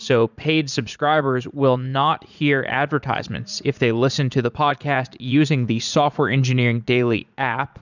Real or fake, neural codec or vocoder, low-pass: fake; vocoder, 44.1 kHz, 80 mel bands, Vocos; 7.2 kHz